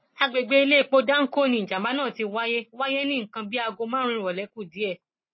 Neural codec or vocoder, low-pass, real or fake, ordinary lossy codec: none; 7.2 kHz; real; MP3, 24 kbps